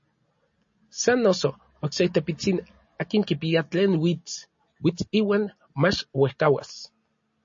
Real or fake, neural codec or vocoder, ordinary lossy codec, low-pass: real; none; MP3, 32 kbps; 7.2 kHz